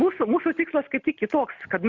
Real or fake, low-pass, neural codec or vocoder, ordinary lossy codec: real; 7.2 kHz; none; MP3, 64 kbps